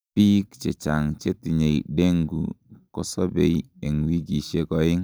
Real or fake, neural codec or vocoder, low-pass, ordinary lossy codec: real; none; none; none